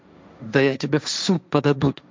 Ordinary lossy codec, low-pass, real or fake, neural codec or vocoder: none; none; fake; codec, 16 kHz, 1.1 kbps, Voila-Tokenizer